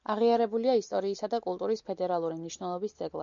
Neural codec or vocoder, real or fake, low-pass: none; real; 7.2 kHz